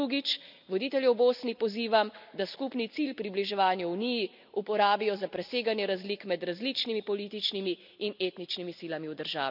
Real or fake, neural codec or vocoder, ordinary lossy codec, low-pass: real; none; none; 5.4 kHz